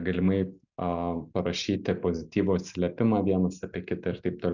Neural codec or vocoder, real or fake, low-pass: none; real; 7.2 kHz